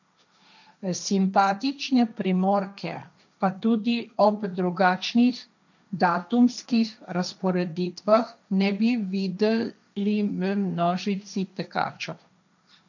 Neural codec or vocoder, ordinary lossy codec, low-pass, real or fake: codec, 16 kHz, 1.1 kbps, Voila-Tokenizer; none; 7.2 kHz; fake